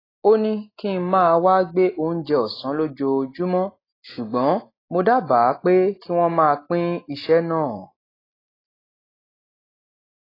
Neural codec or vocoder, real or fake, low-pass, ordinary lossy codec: none; real; 5.4 kHz; AAC, 24 kbps